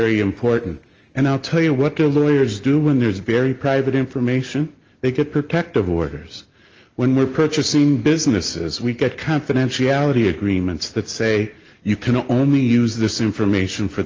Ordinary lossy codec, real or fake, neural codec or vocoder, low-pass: Opus, 24 kbps; real; none; 7.2 kHz